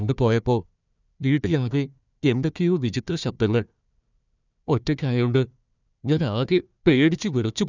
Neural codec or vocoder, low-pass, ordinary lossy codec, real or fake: codec, 16 kHz, 1 kbps, FunCodec, trained on Chinese and English, 50 frames a second; 7.2 kHz; none; fake